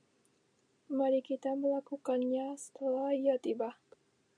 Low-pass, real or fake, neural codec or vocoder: 9.9 kHz; real; none